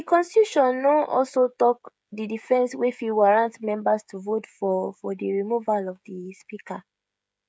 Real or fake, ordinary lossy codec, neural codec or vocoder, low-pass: fake; none; codec, 16 kHz, 16 kbps, FreqCodec, smaller model; none